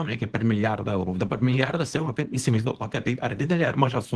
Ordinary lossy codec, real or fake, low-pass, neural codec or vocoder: Opus, 16 kbps; fake; 10.8 kHz; codec, 24 kHz, 0.9 kbps, WavTokenizer, small release